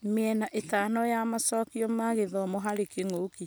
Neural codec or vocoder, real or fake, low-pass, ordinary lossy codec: none; real; none; none